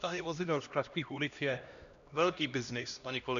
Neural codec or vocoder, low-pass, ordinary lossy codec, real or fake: codec, 16 kHz, 1 kbps, X-Codec, HuBERT features, trained on LibriSpeech; 7.2 kHz; MP3, 96 kbps; fake